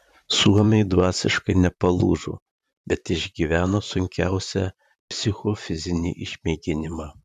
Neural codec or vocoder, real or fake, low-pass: vocoder, 44.1 kHz, 128 mel bands, Pupu-Vocoder; fake; 14.4 kHz